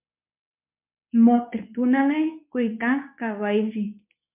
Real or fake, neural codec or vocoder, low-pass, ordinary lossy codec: fake; codec, 24 kHz, 0.9 kbps, WavTokenizer, medium speech release version 2; 3.6 kHz; MP3, 32 kbps